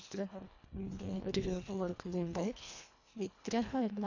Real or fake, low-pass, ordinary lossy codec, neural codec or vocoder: fake; 7.2 kHz; AAC, 48 kbps; codec, 24 kHz, 1.5 kbps, HILCodec